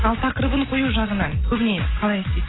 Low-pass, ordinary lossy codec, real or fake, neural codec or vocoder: 7.2 kHz; AAC, 16 kbps; real; none